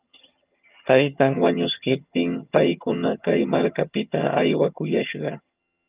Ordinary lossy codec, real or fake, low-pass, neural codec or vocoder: Opus, 24 kbps; fake; 3.6 kHz; vocoder, 22.05 kHz, 80 mel bands, HiFi-GAN